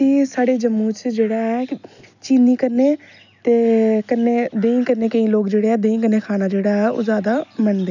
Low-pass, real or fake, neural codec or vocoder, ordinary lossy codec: 7.2 kHz; real; none; none